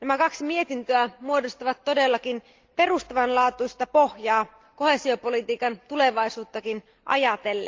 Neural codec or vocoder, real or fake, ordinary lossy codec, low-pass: none; real; Opus, 32 kbps; 7.2 kHz